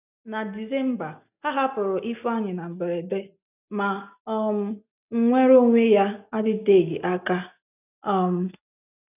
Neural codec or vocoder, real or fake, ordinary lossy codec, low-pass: none; real; none; 3.6 kHz